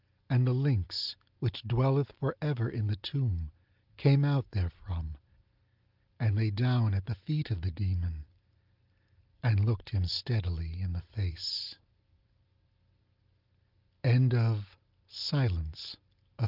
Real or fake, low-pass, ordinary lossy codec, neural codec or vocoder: real; 5.4 kHz; Opus, 32 kbps; none